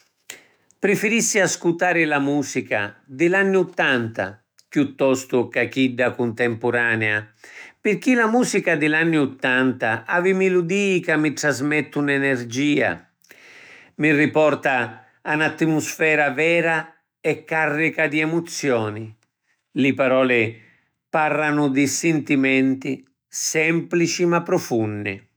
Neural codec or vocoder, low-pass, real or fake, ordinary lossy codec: autoencoder, 48 kHz, 128 numbers a frame, DAC-VAE, trained on Japanese speech; none; fake; none